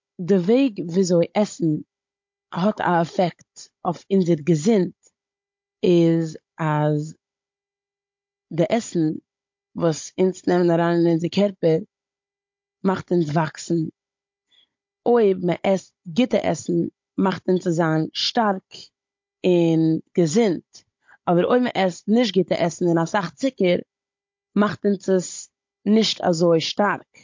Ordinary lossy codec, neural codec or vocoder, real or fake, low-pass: MP3, 48 kbps; codec, 16 kHz, 16 kbps, FunCodec, trained on Chinese and English, 50 frames a second; fake; 7.2 kHz